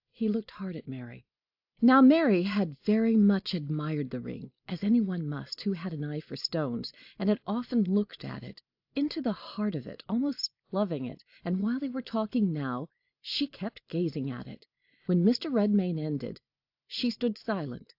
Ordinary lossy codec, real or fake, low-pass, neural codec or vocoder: AAC, 48 kbps; real; 5.4 kHz; none